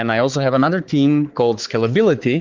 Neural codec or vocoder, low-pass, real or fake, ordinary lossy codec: codec, 16 kHz, 4 kbps, X-Codec, HuBERT features, trained on balanced general audio; 7.2 kHz; fake; Opus, 16 kbps